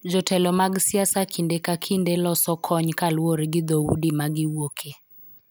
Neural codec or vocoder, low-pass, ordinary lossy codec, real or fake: none; none; none; real